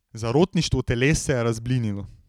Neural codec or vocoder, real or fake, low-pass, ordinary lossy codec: none; real; 19.8 kHz; none